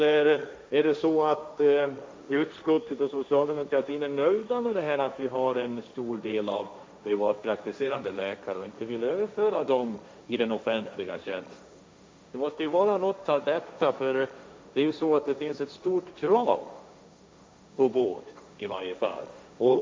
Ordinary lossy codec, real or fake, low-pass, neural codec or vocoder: none; fake; none; codec, 16 kHz, 1.1 kbps, Voila-Tokenizer